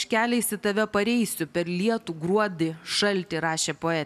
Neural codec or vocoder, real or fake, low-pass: none; real; 14.4 kHz